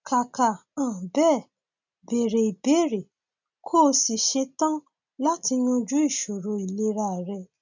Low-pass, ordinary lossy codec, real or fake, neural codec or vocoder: 7.2 kHz; none; real; none